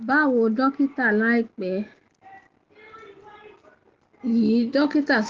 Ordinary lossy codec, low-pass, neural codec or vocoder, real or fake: Opus, 16 kbps; 7.2 kHz; none; real